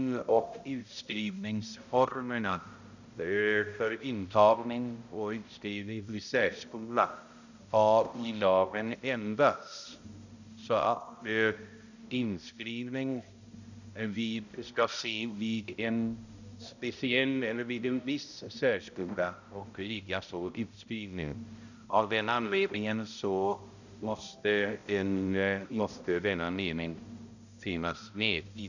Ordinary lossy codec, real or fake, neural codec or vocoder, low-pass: none; fake; codec, 16 kHz, 0.5 kbps, X-Codec, HuBERT features, trained on balanced general audio; 7.2 kHz